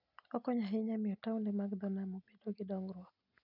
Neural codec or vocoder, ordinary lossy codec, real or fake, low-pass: none; none; real; 5.4 kHz